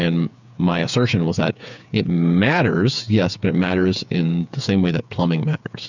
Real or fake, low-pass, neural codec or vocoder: fake; 7.2 kHz; codec, 16 kHz, 8 kbps, FreqCodec, smaller model